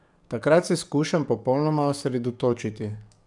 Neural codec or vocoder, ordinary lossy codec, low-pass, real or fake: codec, 44.1 kHz, 7.8 kbps, DAC; none; 10.8 kHz; fake